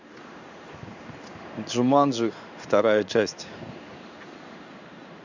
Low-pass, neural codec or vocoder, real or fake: 7.2 kHz; codec, 16 kHz in and 24 kHz out, 1 kbps, XY-Tokenizer; fake